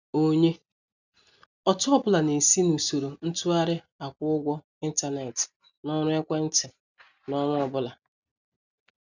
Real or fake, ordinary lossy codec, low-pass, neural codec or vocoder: real; none; 7.2 kHz; none